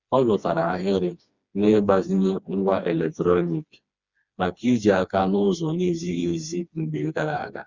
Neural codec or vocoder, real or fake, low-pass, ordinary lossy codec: codec, 16 kHz, 2 kbps, FreqCodec, smaller model; fake; 7.2 kHz; Opus, 64 kbps